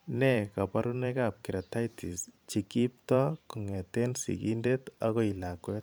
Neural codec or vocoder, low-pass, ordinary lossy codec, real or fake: vocoder, 44.1 kHz, 128 mel bands every 512 samples, BigVGAN v2; none; none; fake